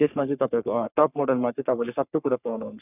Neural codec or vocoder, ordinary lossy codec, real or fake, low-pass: codec, 44.1 kHz, 3.4 kbps, Pupu-Codec; none; fake; 3.6 kHz